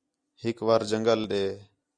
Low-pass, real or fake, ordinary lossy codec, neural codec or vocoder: 9.9 kHz; real; Opus, 64 kbps; none